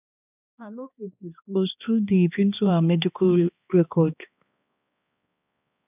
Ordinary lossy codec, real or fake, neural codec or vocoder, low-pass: none; fake; codec, 16 kHz, 2 kbps, X-Codec, HuBERT features, trained on balanced general audio; 3.6 kHz